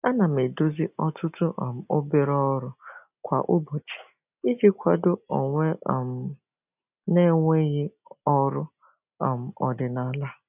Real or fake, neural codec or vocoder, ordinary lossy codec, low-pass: real; none; none; 3.6 kHz